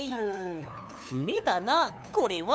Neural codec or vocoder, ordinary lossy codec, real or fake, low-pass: codec, 16 kHz, 2 kbps, FunCodec, trained on LibriTTS, 25 frames a second; none; fake; none